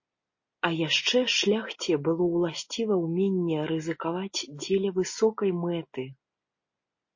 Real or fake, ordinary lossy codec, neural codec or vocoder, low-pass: real; MP3, 32 kbps; none; 7.2 kHz